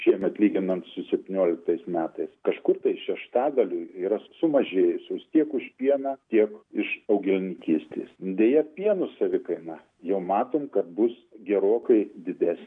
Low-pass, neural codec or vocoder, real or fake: 9.9 kHz; none; real